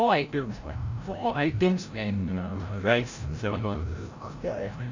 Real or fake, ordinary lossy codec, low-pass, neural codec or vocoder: fake; AAC, 48 kbps; 7.2 kHz; codec, 16 kHz, 0.5 kbps, FreqCodec, larger model